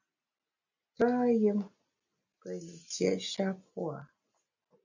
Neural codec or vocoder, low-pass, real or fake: none; 7.2 kHz; real